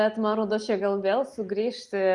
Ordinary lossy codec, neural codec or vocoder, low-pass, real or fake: Opus, 32 kbps; none; 10.8 kHz; real